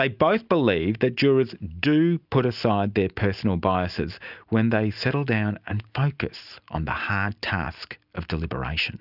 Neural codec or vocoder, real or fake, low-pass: none; real; 5.4 kHz